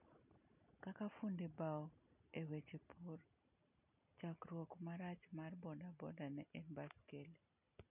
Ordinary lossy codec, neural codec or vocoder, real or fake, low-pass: none; none; real; 3.6 kHz